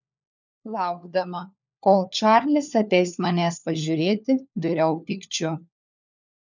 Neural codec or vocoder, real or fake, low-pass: codec, 16 kHz, 4 kbps, FunCodec, trained on LibriTTS, 50 frames a second; fake; 7.2 kHz